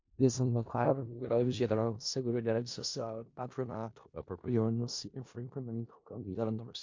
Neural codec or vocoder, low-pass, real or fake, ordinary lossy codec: codec, 16 kHz in and 24 kHz out, 0.4 kbps, LongCat-Audio-Codec, four codebook decoder; 7.2 kHz; fake; MP3, 48 kbps